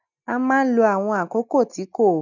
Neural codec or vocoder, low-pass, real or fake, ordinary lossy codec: none; 7.2 kHz; real; none